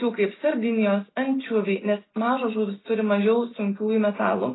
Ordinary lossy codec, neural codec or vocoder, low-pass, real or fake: AAC, 16 kbps; none; 7.2 kHz; real